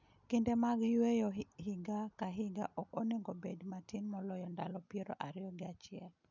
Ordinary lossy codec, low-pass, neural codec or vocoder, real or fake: none; 7.2 kHz; none; real